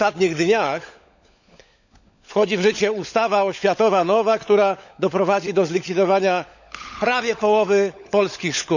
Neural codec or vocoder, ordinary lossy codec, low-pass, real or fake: codec, 16 kHz, 16 kbps, FunCodec, trained on LibriTTS, 50 frames a second; none; 7.2 kHz; fake